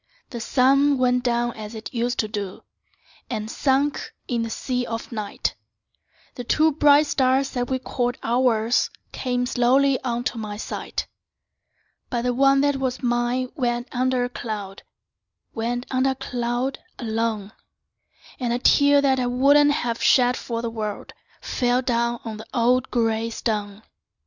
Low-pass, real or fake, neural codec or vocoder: 7.2 kHz; real; none